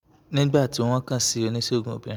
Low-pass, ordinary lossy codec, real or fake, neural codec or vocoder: none; none; real; none